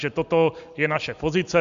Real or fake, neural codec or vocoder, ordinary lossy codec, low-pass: real; none; MP3, 64 kbps; 7.2 kHz